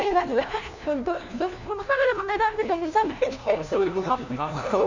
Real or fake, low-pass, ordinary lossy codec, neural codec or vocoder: fake; 7.2 kHz; none; codec, 16 kHz, 1 kbps, FunCodec, trained on LibriTTS, 50 frames a second